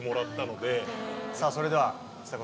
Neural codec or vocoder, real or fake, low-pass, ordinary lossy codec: none; real; none; none